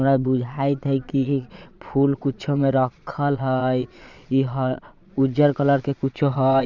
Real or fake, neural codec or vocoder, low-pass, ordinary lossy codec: fake; vocoder, 22.05 kHz, 80 mel bands, Vocos; 7.2 kHz; none